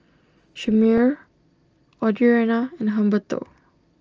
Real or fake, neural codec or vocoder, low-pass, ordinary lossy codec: real; none; 7.2 kHz; Opus, 24 kbps